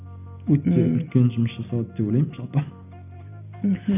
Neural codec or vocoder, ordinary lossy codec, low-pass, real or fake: none; none; 3.6 kHz; real